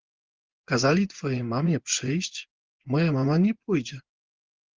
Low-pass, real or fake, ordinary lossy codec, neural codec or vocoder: 7.2 kHz; fake; Opus, 16 kbps; vocoder, 24 kHz, 100 mel bands, Vocos